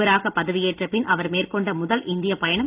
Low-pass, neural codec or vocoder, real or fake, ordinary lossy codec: 3.6 kHz; none; real; Opus, 32 kbps